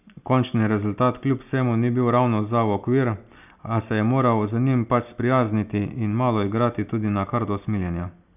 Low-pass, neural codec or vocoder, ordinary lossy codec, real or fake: 3.6 kHz; none; none; real